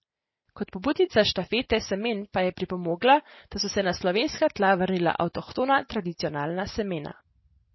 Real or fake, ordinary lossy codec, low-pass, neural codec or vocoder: real; MP3, 24 kbps; 7.2 kHz; none